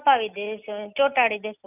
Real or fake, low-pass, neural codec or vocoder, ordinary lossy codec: real; 3.6 kHz; none; none